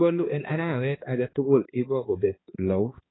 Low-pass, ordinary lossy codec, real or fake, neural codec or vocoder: 7.2 kHz; AAC, 16 kbps; fake; codec, 16 kHz, 2 kbps, X-Codec, HuBERT features, trained on balanced general audio